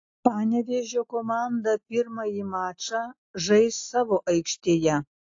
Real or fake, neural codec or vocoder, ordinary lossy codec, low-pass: real; none; AAC, 48 kbps; 7.2 kHz